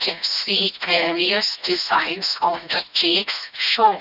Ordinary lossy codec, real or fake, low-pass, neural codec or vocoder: none; fake; 5.4 kHz; codec, 16 kHz, 1 kbps, FreqCodec, smaller model